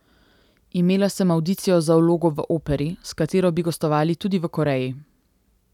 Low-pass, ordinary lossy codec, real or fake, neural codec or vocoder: 19.8 kHz; none; real; none